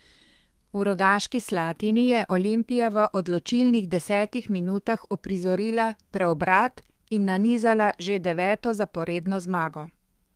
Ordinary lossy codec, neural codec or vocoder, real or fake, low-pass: Opus, 32 kbps; codec, 32 kHz, 1.9 kbps, SNAC; fake; 14.4 kHz